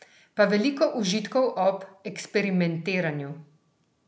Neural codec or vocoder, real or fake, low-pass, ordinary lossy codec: none; real; none; none